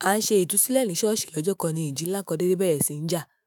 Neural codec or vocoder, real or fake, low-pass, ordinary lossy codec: autoencoder, 48 kHz, 128 numbers a frame, DAC-VAE, trained on Japanese speech; fake; none; none